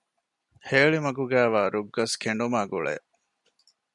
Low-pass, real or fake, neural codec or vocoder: 10.8 kHz; real; none